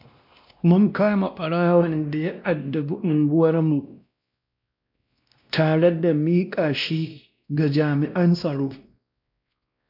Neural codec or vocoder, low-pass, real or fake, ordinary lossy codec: codec, 16 kHz, 1 kbps, X-Codec, WavLM features, trained on Multilingual LibriSpeech; 5.4 kHz; fake; MP3, 48 kbps